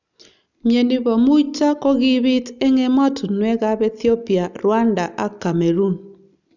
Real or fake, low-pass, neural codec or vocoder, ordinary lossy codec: real; 7.2 kHz; none; none